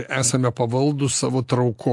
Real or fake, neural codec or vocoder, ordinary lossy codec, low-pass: real; none; AAC, 64 kbps; 10.8 kHz